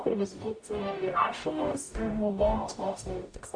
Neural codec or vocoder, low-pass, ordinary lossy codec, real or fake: codec, 44.1 kHz, 0.9 kbps, DAC; 9.9 kHz; MP3, 48 kbps; fake